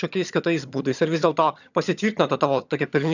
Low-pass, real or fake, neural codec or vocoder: 7.2 kHz; fake; vocoder, 22.05 kHz, 80 mel bands, HiFi-GAN